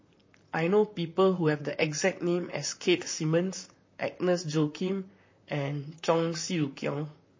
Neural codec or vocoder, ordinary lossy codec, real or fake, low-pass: vocoder, 44.1 kHz, 128 mel bands, Pupu-Vocoder; MP3, 32 kbps; fake; 7.2 kHz